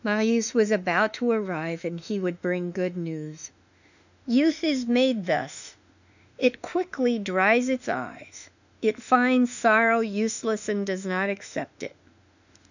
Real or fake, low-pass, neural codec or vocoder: fake; 7.2 kHz; autoencoder, 48 kHz, 32 numbers a frame, DAC-VAE, trained on Japanese speech